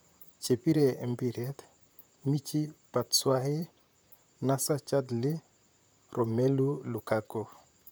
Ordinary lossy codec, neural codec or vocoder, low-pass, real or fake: none; vocoder, 44.1 kHz, 128 mel bands, Pupu-Vocoder; none; fake